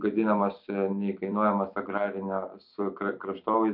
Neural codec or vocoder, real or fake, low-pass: vocoder, 44.1 kHz, 128 mel bands every 256 samples, BigVGAN v2; fake; 5.4 kHz